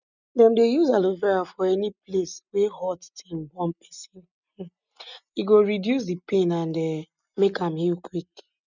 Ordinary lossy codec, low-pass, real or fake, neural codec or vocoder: none; 7.2 kHz; real; none